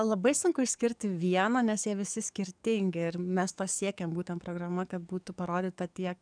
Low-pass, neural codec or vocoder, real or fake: 9.9 kHz; codec, 44.1 kHz, 7.8 kbps, DAC; fake